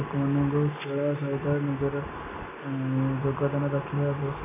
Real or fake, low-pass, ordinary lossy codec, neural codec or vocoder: real; 3.6 kHz; AAC, 16 kbps; none